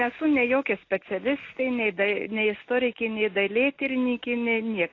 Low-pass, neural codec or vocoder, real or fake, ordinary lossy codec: 7.2 kHz; none; real; AAC, 32 kbps